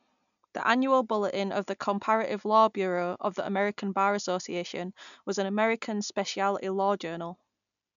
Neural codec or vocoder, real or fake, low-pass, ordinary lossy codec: none; real; 7.2 kHz; none